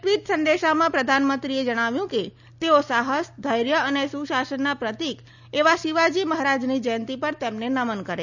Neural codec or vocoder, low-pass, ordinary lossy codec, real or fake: none; 7.2 kHz; none; real